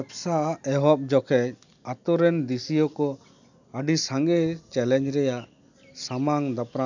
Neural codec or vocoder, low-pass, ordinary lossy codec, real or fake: none; 7.2 kHz; none; real